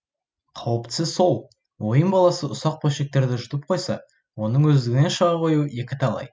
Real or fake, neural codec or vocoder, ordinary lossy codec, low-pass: real; none; none; none